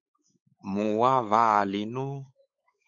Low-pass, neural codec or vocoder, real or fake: 7.2 kHz; codec, 16 kHz, 4 kbps, X-Codec, WavLM features, trained on Multilingual LibriSpeech; fake